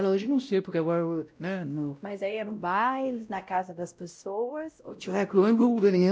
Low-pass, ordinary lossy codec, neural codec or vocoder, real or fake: none; none; codec, 16 kHz, 0.5 kbps, X-Codec, WavLM features, trained on Multilingual LibriSpeech; fake